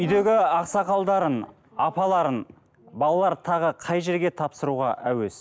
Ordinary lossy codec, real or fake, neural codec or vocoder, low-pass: none; real; none; none